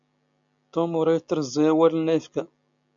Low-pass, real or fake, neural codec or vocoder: 7.2 kHz; real; none